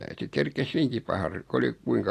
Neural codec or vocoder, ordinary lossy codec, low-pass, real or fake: none; AAC, 48 kbps; 14.4 kHz; real